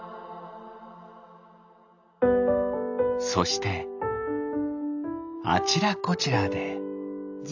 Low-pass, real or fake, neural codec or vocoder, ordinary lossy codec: 7.2 kHz; real; none; AAC, 48 kbps